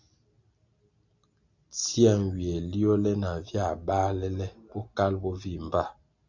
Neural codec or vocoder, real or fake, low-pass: none; real; 7.2 kHz